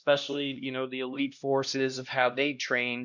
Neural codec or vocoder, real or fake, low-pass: codec, 16 kHz, 1 kbps, X-Codec, HuBERT features, trained on LibriSpeech; fake; 7.2 kHz